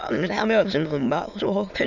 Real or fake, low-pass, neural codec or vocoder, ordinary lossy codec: fake; 7.2 kHz; autoencoder, 22.05 kHz, a latent of 192 numbers a frame, VITS, trained on many speakers; none